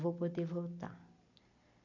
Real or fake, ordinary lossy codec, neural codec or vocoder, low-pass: real; none; none; 7.2 kHz